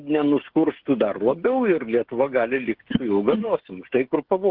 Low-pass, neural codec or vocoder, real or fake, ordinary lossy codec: 5.4 kHz; codec, 16 kHz, 16 kbps, FreqCodec, smaller model; fake; Opus, 16 kbps